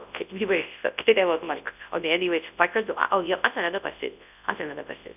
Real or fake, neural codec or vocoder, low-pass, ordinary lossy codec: fake; codec, 24 kHz, 0.9 kbps, WavTokenizer, large speech release; 3.6 kHz; none